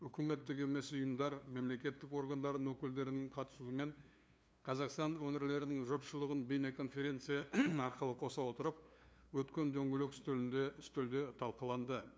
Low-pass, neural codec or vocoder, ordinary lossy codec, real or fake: none; codec, 16 kHz, 2 kbps, FunCodec, trained on LibriTTS, 25 frames a second; none; fake